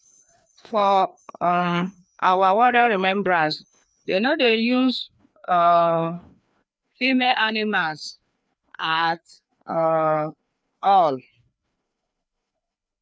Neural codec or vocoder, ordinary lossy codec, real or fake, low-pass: codec, 16 kHz, 2 kbps, FreqCodec, larger model; none; fake; none